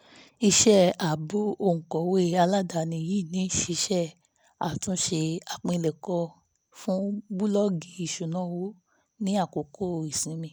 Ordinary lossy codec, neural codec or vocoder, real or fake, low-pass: none; none; real; none